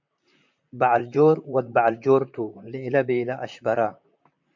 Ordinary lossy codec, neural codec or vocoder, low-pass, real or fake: MP3, 64 kbps; vocoder, 44.1 kHz, 128 mel bands, Pupu-Vocoder; 7.2 kHz; fake